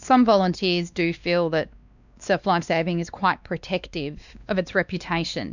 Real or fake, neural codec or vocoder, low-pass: fake; codec, 16 kHz, 2 kbps, X-Codec, WavLM features, trained on Multilingual LibriSpeech; 7.2 kHz